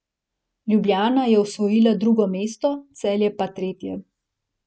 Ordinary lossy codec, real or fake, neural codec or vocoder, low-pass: none; real; none; none